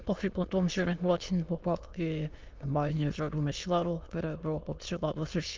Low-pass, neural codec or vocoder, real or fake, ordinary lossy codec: 7.2 kHz; autoencoder, 22.05 kHz, a latent of 192 numbers a frame, VITS, trained on many speakers; fake; Opus, 24 kbps